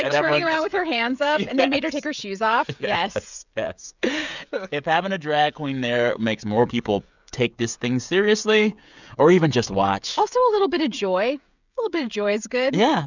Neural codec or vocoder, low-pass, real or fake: codec, 16 kHz, 16 kbps, FreqCodec, smaller model; 7.2 kHz; fake